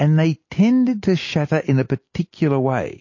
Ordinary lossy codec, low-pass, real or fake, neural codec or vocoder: MP3, 32 kbps; 7.2 kHz; real; none